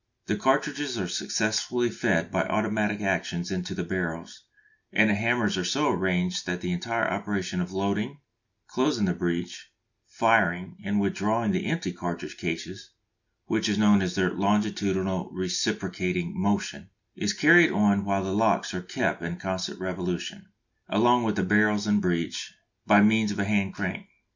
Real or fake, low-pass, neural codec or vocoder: real; 7.2 kHz; none